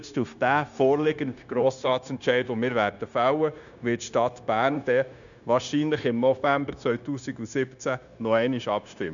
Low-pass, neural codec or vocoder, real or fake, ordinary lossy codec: 7.2 kHz; codec, 16 kHz, 0.9 kbps, LongCat-Audio-Codec; fake; none